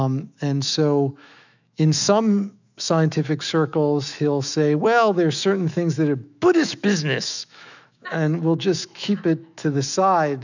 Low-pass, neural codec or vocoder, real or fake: 7.2 kHz; autoencoder, 48 kHz, 128 numbers a frame, DAC-VAE, trained on Japanese speech; fake